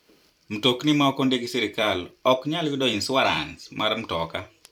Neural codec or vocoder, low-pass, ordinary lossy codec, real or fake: vocoder, 44.1 kHz, 128 mel bands every 256 samples, BigVGAN v2; 19.8 kHz; none; fake